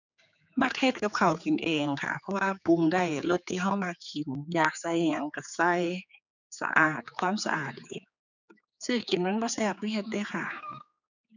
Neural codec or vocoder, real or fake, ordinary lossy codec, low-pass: codec, 16 kHz, 4 kbps, X-Codec, HuBERT features, trained on general audio; fake; none; 7.2 kHz